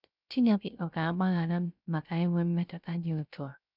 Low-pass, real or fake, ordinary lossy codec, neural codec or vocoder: 5.4 kHz; fake; none; codec, 16 kHz, 0.3 kbps, FocalCodec